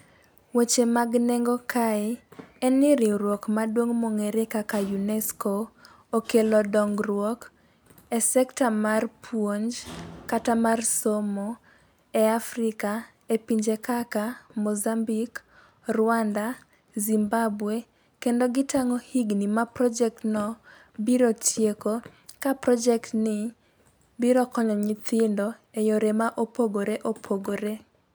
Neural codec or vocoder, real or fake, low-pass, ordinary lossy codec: none; real; none; none